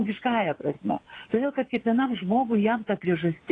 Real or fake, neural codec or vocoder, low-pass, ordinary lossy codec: fake; vocoder, 22.05 kHz, 80 mel bands, Vocos; 9.9 kHz; AAC, 32 kbps